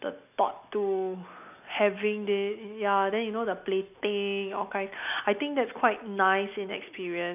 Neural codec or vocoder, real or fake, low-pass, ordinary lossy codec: none; real; 3.6 kHz; none